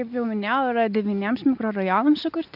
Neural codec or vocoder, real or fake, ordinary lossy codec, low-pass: none; real; AAC, 48 kbps; 5.4 kHz